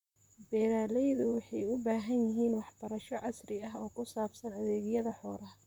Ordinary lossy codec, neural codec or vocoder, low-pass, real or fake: none; vocoder, 44.1 kHz, 128 mel bands, Pupu-Vocoder; 19.8 kHz; fake